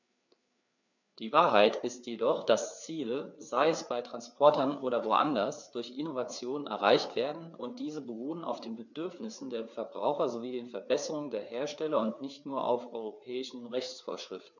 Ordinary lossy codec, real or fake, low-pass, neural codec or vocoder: none; fake; 7.2 kHz; codec, 16 kHz, 4 kbps, FreqCodec, larger model